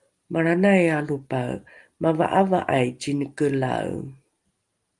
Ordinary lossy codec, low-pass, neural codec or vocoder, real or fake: Opus, 32 kbps; 10.8 kHz; none; real